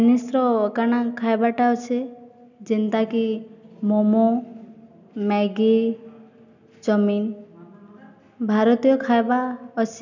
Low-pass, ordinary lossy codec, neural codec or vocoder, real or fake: 7.2 kHz; none; none; real